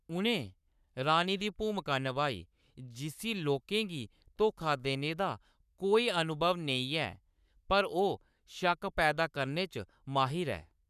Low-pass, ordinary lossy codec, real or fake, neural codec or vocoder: 14.4 kHz; none; fake; codec, 44.1 kHz, 7.8 kbps, Pupu-Codec